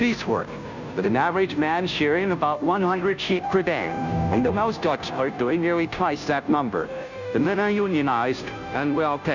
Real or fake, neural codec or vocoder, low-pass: fake; codec, 16 kHz, 0.5 kbps, FunCodec, trained on Chinese and English, 25 frames a second; 7.2 kHz